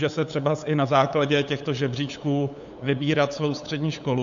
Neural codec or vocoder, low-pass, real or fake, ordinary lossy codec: codec, 16 kHz, 8 kbps, FunCodec, trained on LibriTTS, 25 frames a second; 7.2 kHz; fake; AAC, 64 kbps